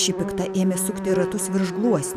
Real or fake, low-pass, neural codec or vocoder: real; 14.4 kHz; none